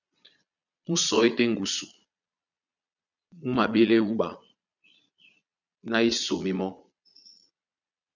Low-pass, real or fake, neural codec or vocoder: 7.2 kHz; fake; vocoder, 22.05 kHz, 80 mel bands, Vocos